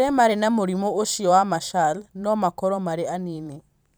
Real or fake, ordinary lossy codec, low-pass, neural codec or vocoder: fake; none; none; vocoder, 44.1 kHz, 128 mel bands every 512 samples, BigVGAN v2